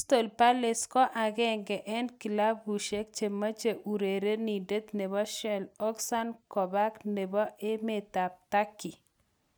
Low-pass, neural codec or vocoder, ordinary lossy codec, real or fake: none; none; none; real